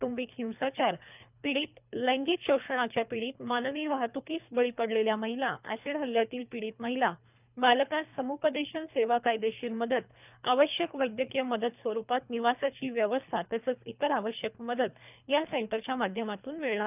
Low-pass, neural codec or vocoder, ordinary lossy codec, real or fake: 3.6 kHz; codec, 24 kHz, 3 kbps, HILCodec; none; fake